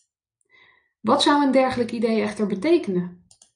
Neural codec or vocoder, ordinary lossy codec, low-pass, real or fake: none; MP3, 96 kbps; 9.9 kHz; real